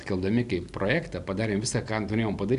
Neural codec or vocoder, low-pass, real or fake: none; 10.8 kHz; real